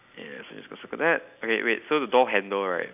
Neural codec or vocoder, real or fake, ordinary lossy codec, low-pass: none; real; none; 3.6 kHz